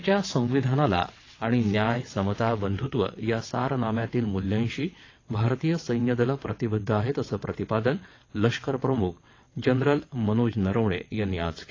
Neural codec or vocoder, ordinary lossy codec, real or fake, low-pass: vocoder, 22.05 kHz, 80 mel bands, WaveNeXt; AAC, 32 kbps; fake; 7.2 kHz